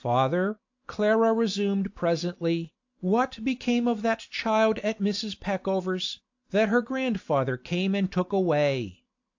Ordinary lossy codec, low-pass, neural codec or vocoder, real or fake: AAC, 48 kbps; 7.2 kHz; none; real